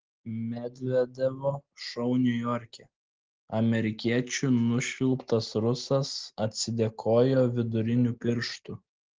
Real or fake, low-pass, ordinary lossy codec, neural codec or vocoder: real; 7.2 kHz; Opus, 16 kbps; none